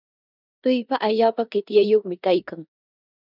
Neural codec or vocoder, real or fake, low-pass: codec, 16 kHz in and 24 kHz out, 0.9 kbps, LongCat-Audio-Codec, four codebook decoder; fake; 5.4 kHz